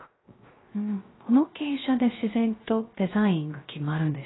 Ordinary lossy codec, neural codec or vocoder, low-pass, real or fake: AAC, 16 kbps; codec, 16 kHz, 0.3 kbps, FocalCodec; 7.2 kHz; fake